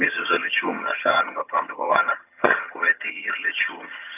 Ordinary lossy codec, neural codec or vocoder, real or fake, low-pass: none; vocoder, 22.05 kHz, 80 mel bands, HiFi-GAN; fake; 3.6 kHz